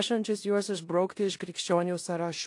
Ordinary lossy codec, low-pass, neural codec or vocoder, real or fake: AAC, 48 kbps; 10.8 kHz; codec, 16 kHz in and 24 kHz out, 0.9 kbps, LongCat-Audio-Codec, four codebook decoder; fake